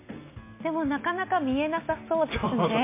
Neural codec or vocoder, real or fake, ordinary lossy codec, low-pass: none; real; MP3, 24 kbps; 3.6 kHz